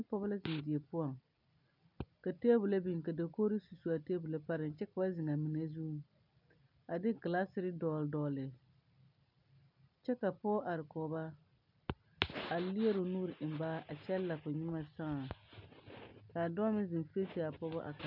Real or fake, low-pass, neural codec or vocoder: real; 5.4 kHz; none